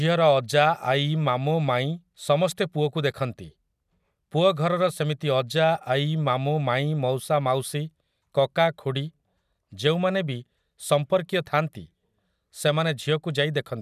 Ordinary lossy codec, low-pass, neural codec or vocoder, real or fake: none; 14.4 kHz; none; real